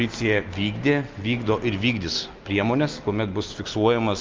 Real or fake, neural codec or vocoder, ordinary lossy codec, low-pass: real; none; Opus, 24 kbps; 7.2 kHz